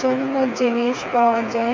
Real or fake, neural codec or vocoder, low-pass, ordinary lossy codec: fake; codec, 16 kHz, 4 kbps, FreqCodec, smaller model; 7.2 kHz; MP3, 48 kbps